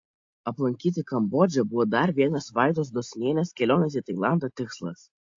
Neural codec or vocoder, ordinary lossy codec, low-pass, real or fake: none; AAC, 48 kbps; 7.2 kHz; real